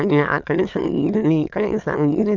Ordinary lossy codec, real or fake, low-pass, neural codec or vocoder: none; fake; 7.2 kHz; autoencoder, 22.05 kHz, a latent of 192 numbers a frame, VITS, trained on many speakers